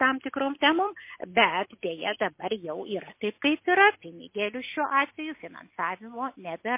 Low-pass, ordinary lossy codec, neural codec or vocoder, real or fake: 3.6 kHz; MP3, 24 kbps; none; real